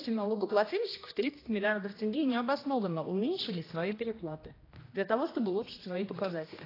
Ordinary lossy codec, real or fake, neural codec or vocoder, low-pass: AAC, 24 kbps; fake; codec, 16 kHz, 1 kbps, X-Codec, HuBERT features, trained on general audio; 5.4 kHz